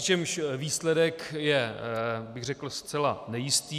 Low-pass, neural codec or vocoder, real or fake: 14.4 kHz; none; real